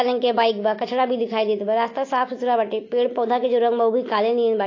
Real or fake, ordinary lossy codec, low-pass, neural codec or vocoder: real; AAC, 32 kbps; 7.2 kHz; none